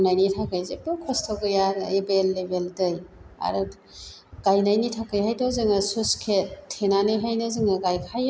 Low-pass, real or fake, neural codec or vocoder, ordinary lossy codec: none; real; none; none